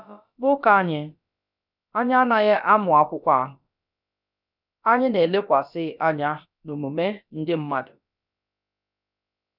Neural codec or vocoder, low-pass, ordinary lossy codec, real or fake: codec, 16 kHz, about 1 kbps, DyCAST, with the encoder's durations; 5.4 kHz; none; fake